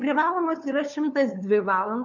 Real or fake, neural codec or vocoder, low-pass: fake; codec, 16 kHz, 2 kbps, FunCodec, trained on LibriTTS, 25 frames a second; 7.2 kHz